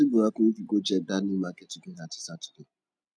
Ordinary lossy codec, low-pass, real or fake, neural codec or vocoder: none; none; real; none